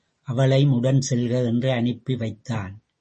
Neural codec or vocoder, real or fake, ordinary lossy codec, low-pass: none; real; MP3, 32 kbps; 10.8 kHz